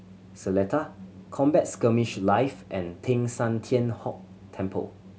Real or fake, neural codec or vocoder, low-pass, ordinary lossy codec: real; none; none; none